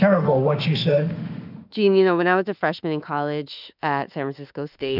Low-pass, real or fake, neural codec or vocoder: 5.4 kHz; fake; autoencoder, 48 kHz, 32 numbers a frame, DAC-VAE, trained on Japanese speech